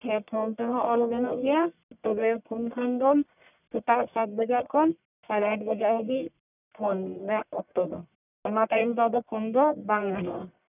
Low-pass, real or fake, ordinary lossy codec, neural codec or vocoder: 3.6 kHz; fake; none; codec, 44.1 kHz, 1.7 kbps, Pupu-Codec